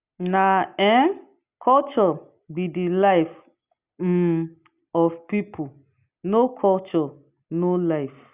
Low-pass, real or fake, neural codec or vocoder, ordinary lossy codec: 3.6 kHz; real; none; Opus, 64 kbps